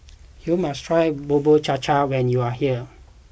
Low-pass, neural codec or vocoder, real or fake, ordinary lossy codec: none; none; real; none